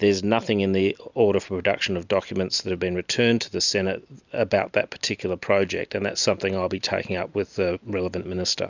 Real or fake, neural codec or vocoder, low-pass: real; none; 7.2 kHz